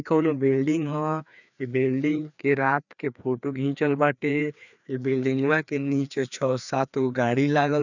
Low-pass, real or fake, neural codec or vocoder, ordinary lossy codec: 7.2 kHz; fake; codec, 16 kHz, 2 kbps, FreqCodec, larger model; none